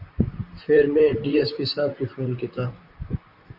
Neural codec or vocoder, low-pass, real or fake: vocoder, 44.1 kHz, 128 mel bands, Pupu-Vocoder; 5.4 kHz; fake